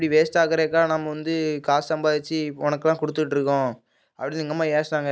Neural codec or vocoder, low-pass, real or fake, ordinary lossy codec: none; none; real; none